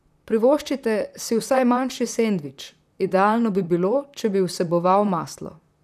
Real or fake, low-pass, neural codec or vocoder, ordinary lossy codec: fake; 14.4 kHz; vocoder, 44.1 kHz, 128 mel bands, Pupu-Vocoder; none